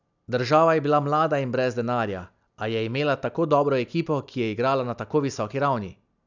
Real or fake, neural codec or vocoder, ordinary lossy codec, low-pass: real; none; none; 7.2 kHz